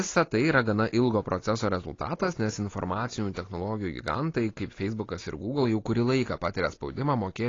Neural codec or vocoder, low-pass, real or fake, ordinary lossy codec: none; 7.2 kHz; real; AAC, 32 kbps